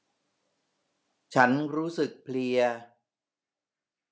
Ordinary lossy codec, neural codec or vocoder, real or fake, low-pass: none; none; real; none